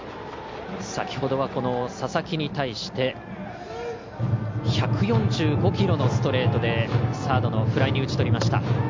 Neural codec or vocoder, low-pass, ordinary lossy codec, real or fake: none; 7.2 kHz; MP3, 64 kbps; real